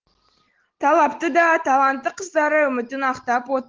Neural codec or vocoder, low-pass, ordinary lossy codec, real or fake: none; 7.2 kHz; Opus, 16 kbps; real